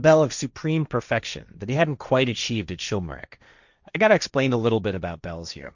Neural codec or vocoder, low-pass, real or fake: codec, 16 kHz, 1.1 kbps, Voila-Tokenizer; 7.2 kHz; fake